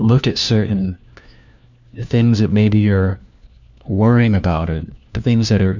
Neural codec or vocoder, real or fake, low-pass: codec, 16 kHz, 1 kbps, FunCodec, trained on LibriTTS, 50 frames a second; fake; 7.2 kHz